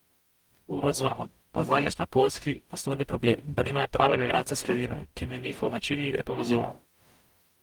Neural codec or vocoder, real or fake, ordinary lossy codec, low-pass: codec, 44.1 kHz, 0.9 kbps, DAC; fake; Opus, 32 kbps; 19.8 kHz